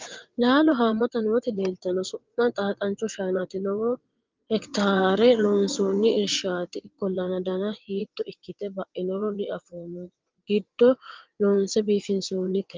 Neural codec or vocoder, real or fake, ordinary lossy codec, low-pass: vocoder, 22.05 kHz, 80 mel bands, WaveNeXt; fake; Opus, 32 kbps; 7.2 kHz